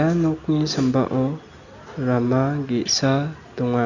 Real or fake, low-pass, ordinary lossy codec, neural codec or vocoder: real; 7.2 kHz; none; none